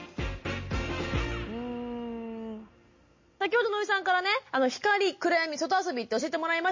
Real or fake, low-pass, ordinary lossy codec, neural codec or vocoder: real; 7.2 kHz; MP3, 32 kbps; none